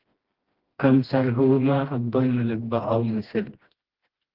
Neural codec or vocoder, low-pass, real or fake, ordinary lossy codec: codec, 16 kHz, 1 kbps, FreqCodec, smaller model; 5.4 kHz; fake; Opus, 16 kbps